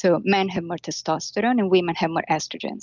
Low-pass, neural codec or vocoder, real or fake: 7.2 kHz; none; real